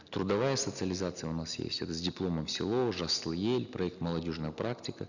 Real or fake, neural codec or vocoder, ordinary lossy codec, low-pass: real; none; none; 7.2 kHz